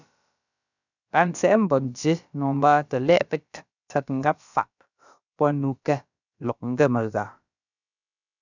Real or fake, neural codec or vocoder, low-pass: fake; codec, 16 kHz, about 1 kbps, DyCAST, with the encoder's durations; 7.2 kHz